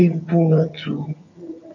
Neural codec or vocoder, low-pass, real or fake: vocoder, 22.05 kHz, 80 mel bands, HiFi-GAN; 7.2 kHz; fake